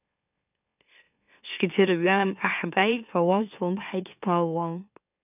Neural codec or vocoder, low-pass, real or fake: autoencoder, 44.1 kHz, a latent of 192 numbers a frame, MeloTTS; 3.6 kHz; fake